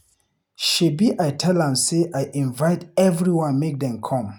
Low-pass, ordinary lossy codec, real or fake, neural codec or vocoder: 19.8 kHz; none; real; none